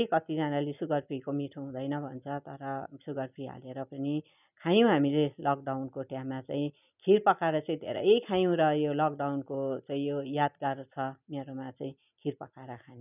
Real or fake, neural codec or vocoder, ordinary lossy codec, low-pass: real; none; none; 3.6 kHz